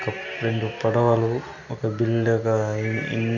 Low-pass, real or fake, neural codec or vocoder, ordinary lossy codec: 7.2 kHz; real; none; none